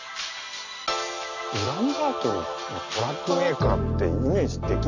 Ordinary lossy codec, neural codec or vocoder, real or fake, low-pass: none; none; real; 7.2 kHz